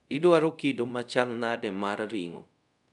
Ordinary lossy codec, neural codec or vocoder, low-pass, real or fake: none; codec, 24 kHz, 0.5 kbps, DualCodec; 10.8 kHz; fake